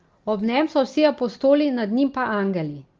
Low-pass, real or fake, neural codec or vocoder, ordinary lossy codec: 7.2 kHz; real; none; Opus, 24 kbps